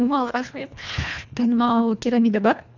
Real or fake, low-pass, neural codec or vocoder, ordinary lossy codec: fake; 7.2 kHz; codec, 24 kHz, 1.5 kbps, HILCodec; none